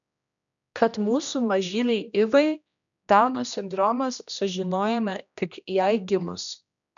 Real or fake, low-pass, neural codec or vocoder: fake; 7.2 kHz; codec, 16 kHz, 1 kbps, X-Codec, HuBERT features, trained on general audio